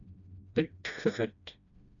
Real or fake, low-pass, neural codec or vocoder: fake; 7.2 kHz; codec, 16 kHz, 1 kbps, FreqCodec, smaller model